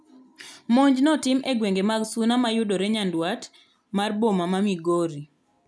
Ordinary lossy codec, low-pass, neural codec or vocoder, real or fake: none; none; none; real